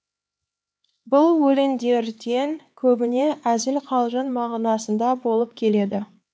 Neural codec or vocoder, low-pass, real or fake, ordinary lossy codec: codec, 16 kHz, 4 kbps, X-Codec, HuBERT features, trained on LibriSpeech; none; fake; none